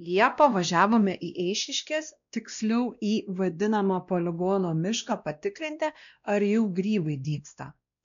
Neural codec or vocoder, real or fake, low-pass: codec, 16 kHz, 1 kbps, X-Codec, WavLM features, trained on Multilingual LibriSpeech; fake; 7.2 kHz